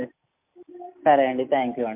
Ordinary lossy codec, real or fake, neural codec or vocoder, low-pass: MP3, 32 kbps; real; none; 3.6 kHz